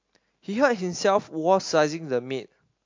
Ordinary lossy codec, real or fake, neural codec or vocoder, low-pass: MP3, 48 kbps; real; none; 7.2 kHz